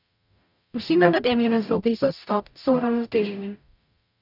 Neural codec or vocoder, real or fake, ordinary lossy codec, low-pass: codec, 44.1 kHz, 0.9 kbps, DAC; fake; none; 5.4 kHz